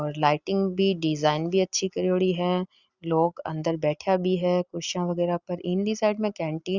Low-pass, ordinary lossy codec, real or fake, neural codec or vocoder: 7.2 kHz; Opus, 64 kbps; real; none